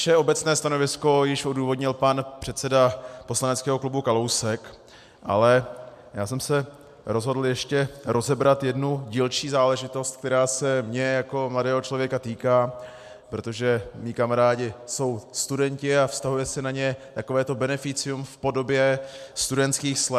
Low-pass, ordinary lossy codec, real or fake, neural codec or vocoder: 14.4 kHz; MP3, 96 kbps; real; none